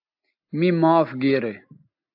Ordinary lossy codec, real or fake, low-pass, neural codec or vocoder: AAC, 48 kbps; real; 5.4 kHz; none